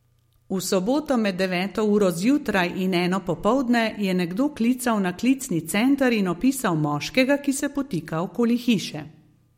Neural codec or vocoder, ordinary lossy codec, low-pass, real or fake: none; MP3, 64 kbps; 19.8 kHz; real